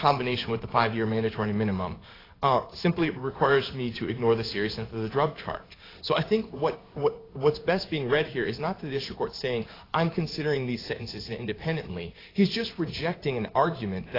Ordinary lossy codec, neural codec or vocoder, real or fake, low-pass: AAC, 24 kbps; codec, 16 kHz in and 24 kHz out, 1 kbps, XY-Tokenizer; fake; 5.4 kHz